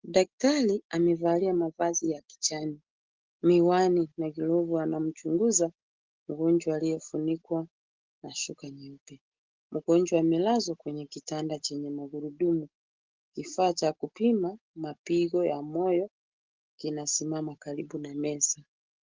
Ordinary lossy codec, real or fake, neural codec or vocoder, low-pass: Opus, 16 kbps; real; none; 7.2 kHz